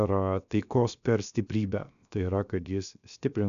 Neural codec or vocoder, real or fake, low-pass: codec, 16 kHz, 0.7 kbps, FocalCodec; fake; 7.2 kHz